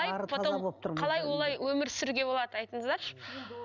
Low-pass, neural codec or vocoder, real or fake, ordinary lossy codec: 7.2 kHz; none; real; none